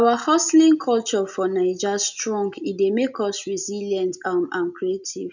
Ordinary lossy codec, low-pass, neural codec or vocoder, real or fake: none; 7.2 kHz; none; real